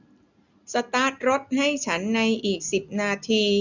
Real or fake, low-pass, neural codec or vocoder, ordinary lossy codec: real; 7.2 kHz; none; none